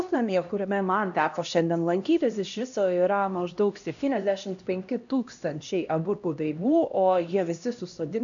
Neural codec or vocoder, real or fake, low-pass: codec, 16 kHz, 1 kbps, X-Codec, HuBERT features, trained on LibriSpeech; fake; 7.2 kHz